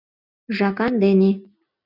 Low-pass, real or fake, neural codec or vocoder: 5.4 kHz; real; none